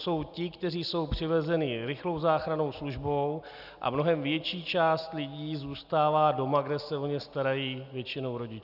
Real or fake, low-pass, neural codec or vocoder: real; 5.4 kHz; none